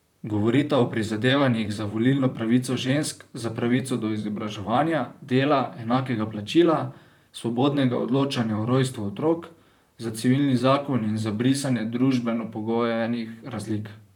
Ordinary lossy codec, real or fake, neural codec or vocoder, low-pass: none; fake; vocoder, 44.1 kHz, 128 mel bands, Pupu-Vocoder; 19.8 kHz